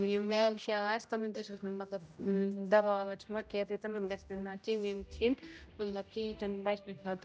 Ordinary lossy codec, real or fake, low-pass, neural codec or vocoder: none; fake; none; codec, 16 kHz, 0.5 kbps, X-Codec, HuBERT features, trained on general audio